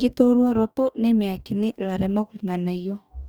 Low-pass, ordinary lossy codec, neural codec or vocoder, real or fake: none; none; codec, 44.1 kHz, 2.6 kbps, DAC; fake